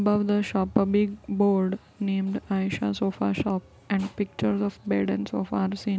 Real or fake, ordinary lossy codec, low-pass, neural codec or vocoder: real; none; none; none